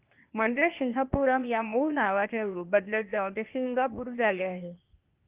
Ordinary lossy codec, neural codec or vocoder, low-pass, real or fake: Opus, 32 kbps; codec, 16 kHz, 0.8 kbps, ZipCodec; 3.6 kHz; fake